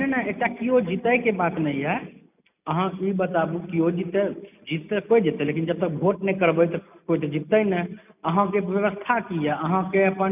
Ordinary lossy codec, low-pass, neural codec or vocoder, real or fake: none; 3.6 kHz; none; real